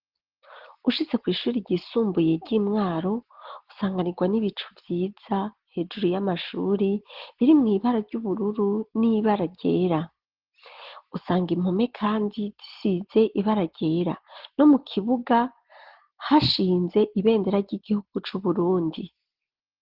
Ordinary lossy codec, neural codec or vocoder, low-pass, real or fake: Opus, 16 kbps; none; 5.4 kHz; real